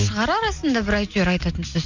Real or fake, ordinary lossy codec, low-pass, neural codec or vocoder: real; Opus, 64 kbps; 7.2 kHz; none